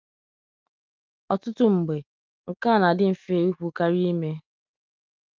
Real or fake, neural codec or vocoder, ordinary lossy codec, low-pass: real; none; Opus, 32 kbps; 7.2 kHz